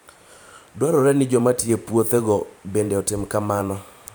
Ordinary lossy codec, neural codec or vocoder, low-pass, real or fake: none; vocoder, 44.1 kHz, 128 mel bands every 512 samples, BigVGAN v2; none; fake